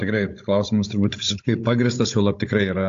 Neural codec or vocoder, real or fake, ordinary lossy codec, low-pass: codec, 16 kHz, 16 kbps, FunCodec, trained on Chinese and English, 50 frames a second; fake; AAC, 48 kbps; 7.2 kHz